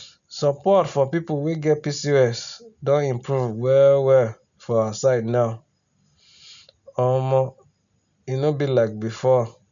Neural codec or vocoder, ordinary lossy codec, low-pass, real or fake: none; none; 7.2 kHz; real